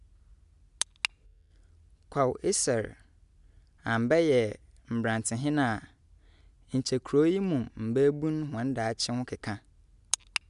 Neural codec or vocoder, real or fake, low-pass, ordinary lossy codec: none; real; 10.8 kHz; none